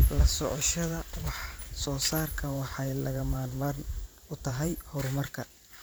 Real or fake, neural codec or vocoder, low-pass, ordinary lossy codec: fake; vocoder, 44.1 kHz, 128 mel bands every 512 samples, BigVGAN v2; none; none